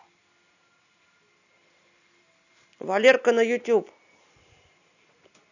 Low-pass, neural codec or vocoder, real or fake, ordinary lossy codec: 7.2 kHz; none; real; none